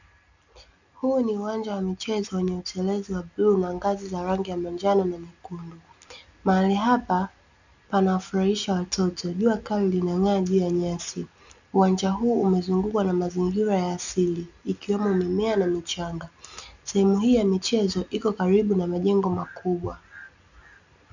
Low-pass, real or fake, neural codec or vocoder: 7.2 kHz; real; none